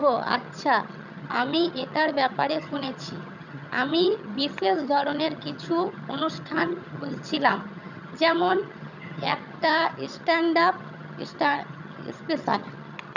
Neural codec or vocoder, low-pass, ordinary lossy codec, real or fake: vocoder, 22.05 kHz, 80 mel bands, HiFi-GAN; 7.2 kHz; none; fake